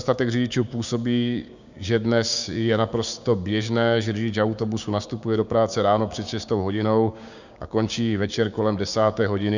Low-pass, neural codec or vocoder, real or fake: 7.2 kHz; codec, 16 kHz, 6 kbps, DAC; fake